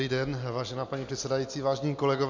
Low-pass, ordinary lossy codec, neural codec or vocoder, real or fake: 7.2 kHz; MP3, 48 kbps; none; real